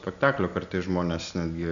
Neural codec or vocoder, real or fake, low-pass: none; real; 7.2 kHz